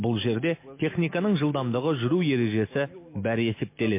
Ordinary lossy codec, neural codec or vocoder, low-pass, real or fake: MP3, 24 kbps; none; 3.6 kHz; real